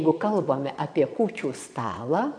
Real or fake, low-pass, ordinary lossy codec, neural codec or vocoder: fake; 9.9 kHz; AAC, 64 kbps; vocoder, 22.05 kHz, 80 mel bands, Vocos